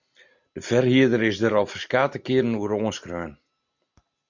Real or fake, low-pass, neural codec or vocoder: real; 7.2 kHz; none